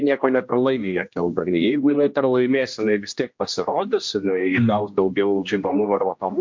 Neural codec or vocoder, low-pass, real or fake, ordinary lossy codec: codec, 16 kHz, 1 kbps, X-Codec, HuBERT features, trained on general audio; 7.2 kHz; fake; MP3, 48 kbps